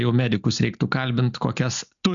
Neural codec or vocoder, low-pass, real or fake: none; 7.2 kHz; real